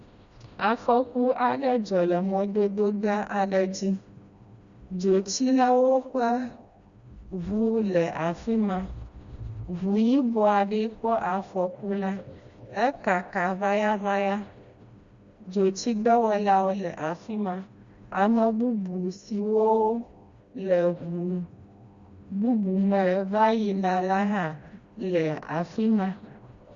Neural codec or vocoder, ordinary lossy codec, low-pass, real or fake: codec, 16 kHz, 1 kbps, FreqCodec, smaller model; Opus, 64 kbps; 7.2 kHz; fake